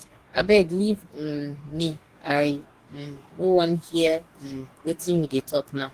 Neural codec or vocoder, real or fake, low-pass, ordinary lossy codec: codec, 44.1 kHz, 2.6 kbps, DAC; fake; 14.4 kHz; Opus, 24 kbps